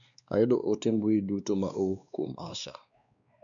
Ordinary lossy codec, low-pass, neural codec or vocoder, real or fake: none; 7.2 kHz; codec, 16 kHz, 2 kbps, X-Codec, WavLM features, trained on Multilingual LibriSpeech; fake